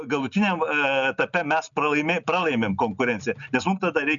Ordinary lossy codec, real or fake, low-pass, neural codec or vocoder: MP3, 96 kbps; real; 7.2 kHz; none